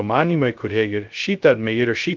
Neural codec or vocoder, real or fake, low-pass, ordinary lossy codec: codec, 16 kHz, 0.2 kbps, FocalCodec; fake; 7.2 kHz; Opus, 32 kbps